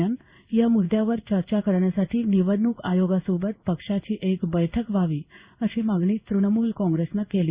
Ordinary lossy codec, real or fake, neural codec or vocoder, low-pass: Opus, 64 kbps; fake; codec, 16 kHz in and 24 kHz out, 1 kbps, XY-Tokenizer; 3.6 kHz